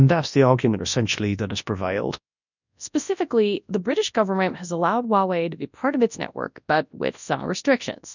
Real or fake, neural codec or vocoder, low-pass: fake; codec, 24 kHz, 0.9 kbps, WavTokenizer, large speech release; 7.2 kHz